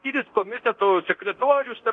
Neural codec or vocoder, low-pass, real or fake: codec, 24 kHz, 0.9 kbps, DualCodec; 9.9 kHz; fake